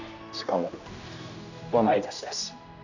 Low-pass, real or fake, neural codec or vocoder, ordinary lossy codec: 7.2 kHz; fake; codec, 16 kHz, 1 kbps, X-Codec, HuBERT features, trained on general audio; none